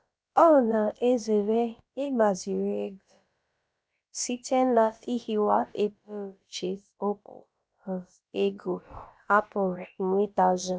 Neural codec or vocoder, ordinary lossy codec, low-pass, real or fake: codec, 16 kHz, about 1 kbps, DyCAST, with the encoder's durations; none; none; fake